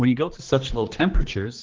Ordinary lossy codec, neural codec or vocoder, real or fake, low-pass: Opus, 16 kbps; codec, 16 kHz, 2 kbps, X-Codec, HuBERT features, trained on general audio; fake; 7.2 kHz